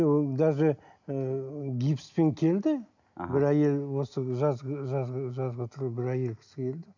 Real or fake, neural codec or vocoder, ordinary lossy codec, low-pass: real; none; none; 7.2 kHz